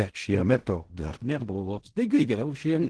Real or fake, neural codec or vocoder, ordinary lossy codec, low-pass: fake; codec, 16 kHz in and 24 kHz out, 0.4 kbps, LongCat-Audio-Codec, fine tuned four codebook decoder; Opus, 16 kbps; 10.8 kHz